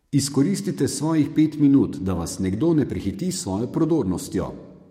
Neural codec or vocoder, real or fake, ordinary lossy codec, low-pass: codec, 44.1 kHz, 7.8 kbps, DAC; fake; MP3, 64 kbps; 19.8 kHz